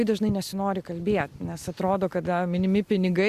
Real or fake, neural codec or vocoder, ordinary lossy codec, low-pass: real; none; Opus, 64 kbps; 14.4 kHz